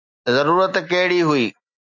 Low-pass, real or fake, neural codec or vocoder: 7.2 kHz; real; none